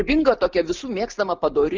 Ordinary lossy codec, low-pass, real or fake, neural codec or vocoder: Opus, 32 kbps; 7.2 kHz; real; none